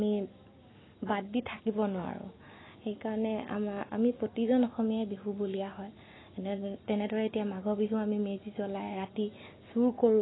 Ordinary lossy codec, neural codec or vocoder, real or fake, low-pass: AAC, 16 kbps; none; real; 7.2 kHz